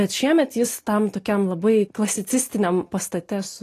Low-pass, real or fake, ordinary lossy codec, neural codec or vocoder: 14.4 kHz; real; AAC, 48 kbps; none